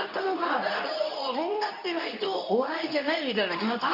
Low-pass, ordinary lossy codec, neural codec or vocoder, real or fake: 5.4 kHz; none; codec, 24 kHz, 0.9 kbps, WavTokenizer, medium speech release version 2; fake